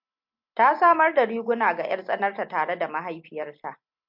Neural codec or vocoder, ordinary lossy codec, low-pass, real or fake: none; MP3, 48 kbps; 5.4 kHz; real